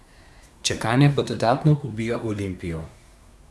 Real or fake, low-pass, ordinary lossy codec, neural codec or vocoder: fake; none; none; codec, 24 kHz, 1 kbps, SNAC